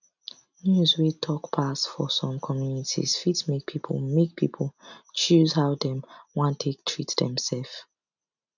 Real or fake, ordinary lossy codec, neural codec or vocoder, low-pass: real; none; none; 7.2 kHz